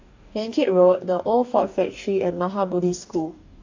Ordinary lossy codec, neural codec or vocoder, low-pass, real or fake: AAC, 48 kbps; codec, 44.1 kHz, 2.6 kbps, DAC; 7.2 kHz; fake